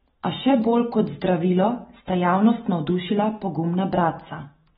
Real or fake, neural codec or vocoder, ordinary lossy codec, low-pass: real; none; AAC, 16 kbps; 19.8 kHz